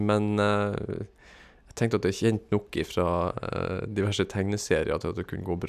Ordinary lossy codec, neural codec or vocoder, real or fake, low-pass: none; none; real; 14.4 kHz